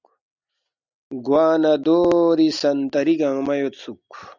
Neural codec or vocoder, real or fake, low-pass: none; real; 7.2 kHz